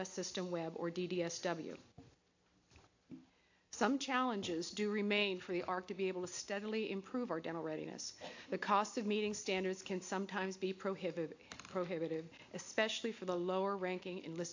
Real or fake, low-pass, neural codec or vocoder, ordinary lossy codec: real; 7.2 kHz; none; AAC, 48 kbps